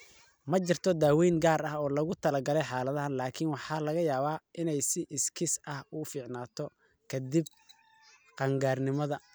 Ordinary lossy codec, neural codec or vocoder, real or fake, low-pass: none; none; real; none